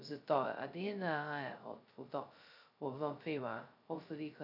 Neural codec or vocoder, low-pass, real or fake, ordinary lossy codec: codec, 16 kHz, 0.2 kbps, FocalCodec; 5.4 kHz; fake; none